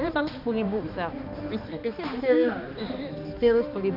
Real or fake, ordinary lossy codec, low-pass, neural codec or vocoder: fake; none; 5.4 kHz; codec, 16 kHz, 2 kbps, X-Codec, HuBERT features, trained on balanced general audio